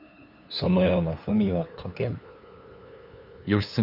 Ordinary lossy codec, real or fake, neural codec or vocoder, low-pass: none; fake; codec, 16 kHz, 2 kbps, FunCodec, trained on LibriTTS, 25 frames a second; 5.4 kHz